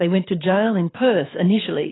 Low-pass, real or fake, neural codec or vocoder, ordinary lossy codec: 7.2 kHz; fake; codec, 24 kHz, 6 kbps, HILCodec; AAC, 16 kbps